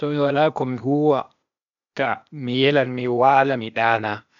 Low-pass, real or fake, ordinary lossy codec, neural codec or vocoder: 7.2 kHz; fake; none; codec, 16 kHz, 0.8 kbps, ZipCodec